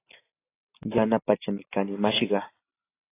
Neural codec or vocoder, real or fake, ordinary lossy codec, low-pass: none; real; AAC, 24 kbps; 3.6 kHz